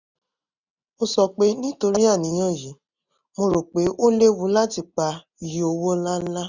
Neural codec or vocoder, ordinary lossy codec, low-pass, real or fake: none; none; 7.2 kHz; real